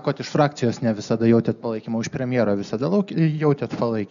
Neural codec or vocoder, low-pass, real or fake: none; 7.2 kHz; real